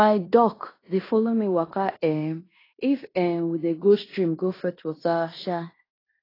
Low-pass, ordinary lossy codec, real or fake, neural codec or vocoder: 5.4 kHz; AAC, 24 kbps; fake; codec, 16 kHz in and 24 kHz out, 0.9 kbps, LongCat-Audio-Codec, fine tuned four codebook decoder